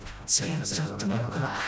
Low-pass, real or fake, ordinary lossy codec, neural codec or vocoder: none; fake; none; codec, 16 kHz, 0.5 kbps, FreqCodec, smaller model